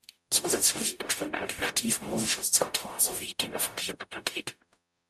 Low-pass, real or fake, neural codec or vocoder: 14.4 kHz; fake; codec, 44.1 kHz, 0.9 kbps, DAC